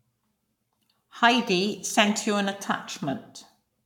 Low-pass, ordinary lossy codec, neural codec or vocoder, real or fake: 19.8 kHz; none; codec, 44.1 kHz, 7.8 kbps, Pupu-Codec; fake